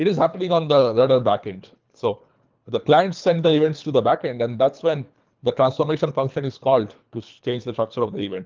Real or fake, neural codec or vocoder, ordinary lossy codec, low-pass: fake; codec, 24 kHz, 3 kbps, HILCodec; Opus, 24 kbps; 7.2 kHz